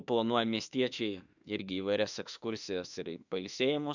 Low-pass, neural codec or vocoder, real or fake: 7.2 kHz; autoencoder, 48 kHz, 32 numbers a frame, DAC-VAE, trained on Japanese speech; fake